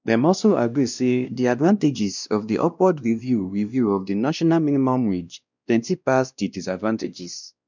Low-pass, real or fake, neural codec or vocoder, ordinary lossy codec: 7.2 kHz; fake; codec, 16 kHz, 1 kbps, X-Codec, WavLM features, trained on Multilingual LibriSpeech; none